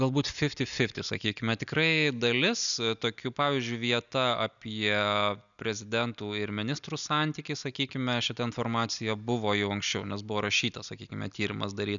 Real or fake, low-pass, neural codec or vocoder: real; 7.2 kHz; none